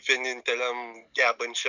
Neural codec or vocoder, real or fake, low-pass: none; real; 7.2 kHz